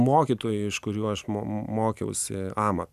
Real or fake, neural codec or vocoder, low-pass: real; none; 14.4 kHz